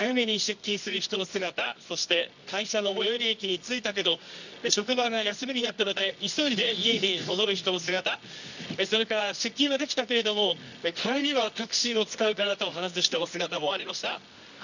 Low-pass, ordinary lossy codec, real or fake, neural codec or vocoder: 7.2 kHz; none; fake; codec, 24 kHz, 0.9 kbps, WavTokenizer, medium music audio release